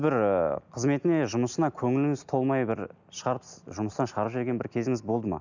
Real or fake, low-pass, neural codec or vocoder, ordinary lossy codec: real; 7.2 kHz; none; none